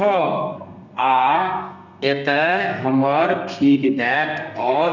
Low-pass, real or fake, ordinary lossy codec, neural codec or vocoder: 7.2 kHz; fake; none; codec, 32 kHz, 1.9 kbps, SNAC